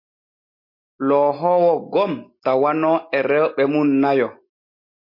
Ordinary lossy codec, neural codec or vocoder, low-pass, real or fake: MP3, 32 kbps; none; 5.4 kHz; real